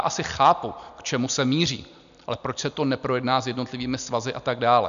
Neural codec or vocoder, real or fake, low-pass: none; real; 7.2 kHz